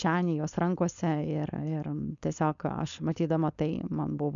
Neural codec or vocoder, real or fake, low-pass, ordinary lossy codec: codec, 16 kHz, 4.8 kbps, FACodec; fake; 7.2 kHz; MP3, 96 kbps